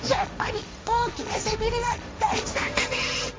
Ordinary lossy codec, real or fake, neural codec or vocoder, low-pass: none; fake; codec, 16 kHz, 1.1 kbps, Voila-Tokenizer; none